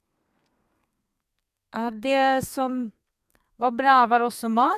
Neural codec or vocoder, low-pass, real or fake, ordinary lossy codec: codec, 32 kHz, 1.9 kbps, SNAC; 14.4 kHz; fake; MP3, 96 kbps